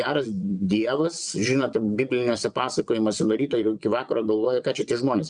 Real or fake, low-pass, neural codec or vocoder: fake; 9.9 kHz; vocoder, 22.05 kHz, 80 mel bands, WaveNeXt